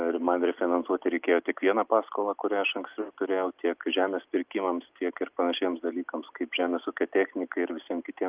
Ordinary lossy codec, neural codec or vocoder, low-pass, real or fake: Opus, 24 kbps; none; 3.6 kHz; real